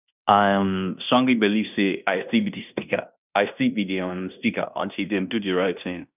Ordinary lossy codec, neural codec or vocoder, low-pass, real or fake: none; codec, 16 kHz in and 24 kHz out, 0.9 kbps, LongCat-Audio-Codec, fine tuned four codebook decoder; 3.6 kHz; fake